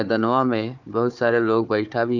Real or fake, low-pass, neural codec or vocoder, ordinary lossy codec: fake; 7.2 kHz; codec, 16 kHz, 4 kbps, FunCodec, trained on Chinese and English, 50 frames a second; none